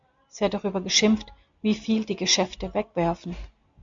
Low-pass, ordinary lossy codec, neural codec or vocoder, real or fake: 7.2 kHz; MP3, 64 kbps; none; real